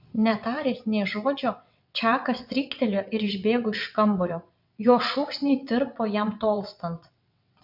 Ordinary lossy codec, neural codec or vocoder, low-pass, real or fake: MP3, 48 kbps; vocoder, 22.05 kHz, 80 mel bands, WaveNeXt; 5.4 kHz; fake